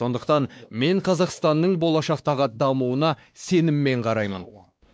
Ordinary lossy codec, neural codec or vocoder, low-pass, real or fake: none; codec, 16 kHz, 2 kbps, X-Codec, WavLM features, trained on Multilingual LibriSpeech; none; fake